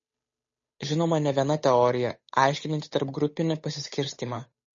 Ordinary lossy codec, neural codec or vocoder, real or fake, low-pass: MP3, 32 kbps; codec, 16 kHz, 8 kbps, FunCodec, trained on Chinese and English, 25 frames a second; fake; 7.2 kHz